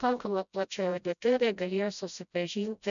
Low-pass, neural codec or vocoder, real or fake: 7.2 kHz; codec, 16 kHz, 0.5 kbps, FreqCodec, smaller model; fake